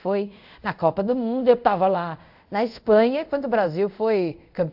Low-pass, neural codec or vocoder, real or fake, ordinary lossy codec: 5.4 kHz; codec, 24 kHz, 0.5 kbps, DualCodec; fake; AAC, 48 kbps